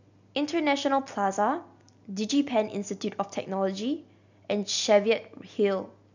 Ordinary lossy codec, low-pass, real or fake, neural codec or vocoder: none; 7.2 kHz; real; none